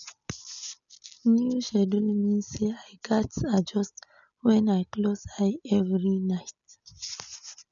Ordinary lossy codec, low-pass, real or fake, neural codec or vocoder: none; 7.2 kHz; real; none